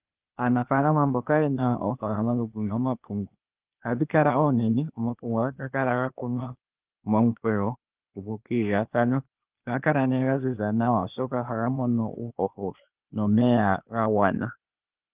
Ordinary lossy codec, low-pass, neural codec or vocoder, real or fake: Opus, 32 kbps; 3.6 kHz; codec, 16 kHz, 0.8 kbps, ZipCodec; fake